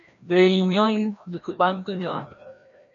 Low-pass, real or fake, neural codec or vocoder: 7.2 kHz; fake; codec, 16 kHz, 1 kbps, FreqCodec, larger model